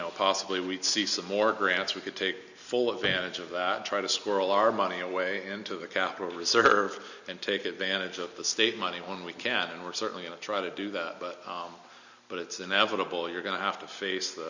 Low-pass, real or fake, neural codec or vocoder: 7.2 kHz; real; none